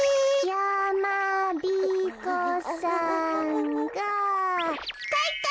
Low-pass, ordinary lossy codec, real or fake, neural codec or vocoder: none; none; real; none